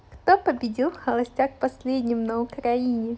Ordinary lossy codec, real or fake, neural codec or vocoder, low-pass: none; real; none; none